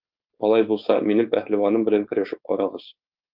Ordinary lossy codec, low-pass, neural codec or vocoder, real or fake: Opus, 32 kbps; 5.4 kHz; codec, 16 kHz, 4.8 kbps, FACodec; fake